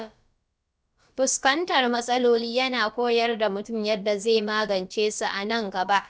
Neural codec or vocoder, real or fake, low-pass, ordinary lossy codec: codec, 16 kHz, about 1 kbps, DyCAST, with the encoder's durations; fake; none; none